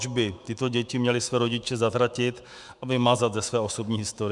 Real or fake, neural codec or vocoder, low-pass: fake; autoencoder, 48 kHz, 128 numbers a frame, DAC-VAE, trained on Japanese speech; 10.8 kHz